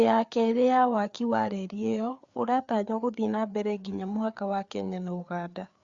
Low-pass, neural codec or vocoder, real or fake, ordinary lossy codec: 7.2 kHz; codec, 16 kHz, 4 kbps, FreqCodec, larger model; fake; Opus, 64 kbps